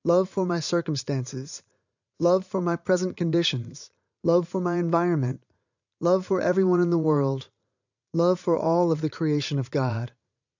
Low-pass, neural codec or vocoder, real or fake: 7.2 kHz; vocoder, 44.1 kHz, 80 mel bands, Vocos; fake